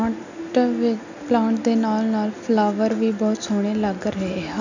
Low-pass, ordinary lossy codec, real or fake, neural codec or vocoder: 7.2 kHz; none; real; none